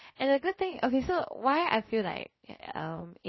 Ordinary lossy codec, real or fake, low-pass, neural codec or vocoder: MP3, 24 kbps; fake; 7.2 kHz; codec, 16 kHz, 0.7 kbps, FocalCodec